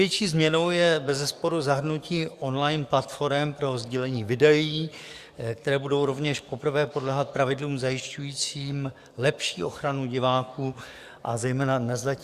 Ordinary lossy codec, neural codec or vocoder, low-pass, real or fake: Opus, 64 kbps; codec, 44.1 kHz, 7.8 kbps, DAC; 14.4 kHz; fake